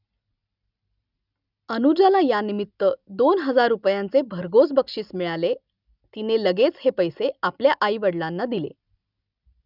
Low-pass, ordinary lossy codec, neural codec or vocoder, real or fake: 5.4 kHz; none; none; real